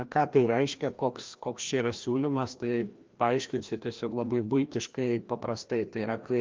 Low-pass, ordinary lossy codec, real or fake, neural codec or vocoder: 7.2 kHz; Opus, 32 kbps; fake; codec, 16 kHz, 1 kbps, FreqCodec, larger model